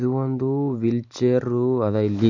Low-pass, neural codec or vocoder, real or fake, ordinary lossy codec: 7.2 kHz; none; real; none